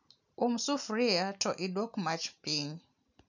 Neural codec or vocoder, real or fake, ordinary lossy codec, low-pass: none; real; none; 7.2 kHz